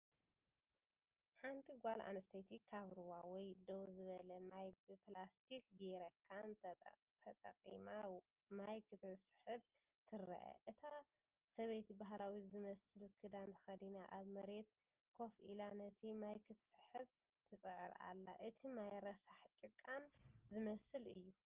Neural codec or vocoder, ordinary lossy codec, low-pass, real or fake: none; Opus, 24 kbps; 3.6 kHz; real